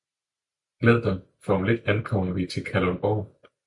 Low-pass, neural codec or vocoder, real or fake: 10.8 kHz; none; real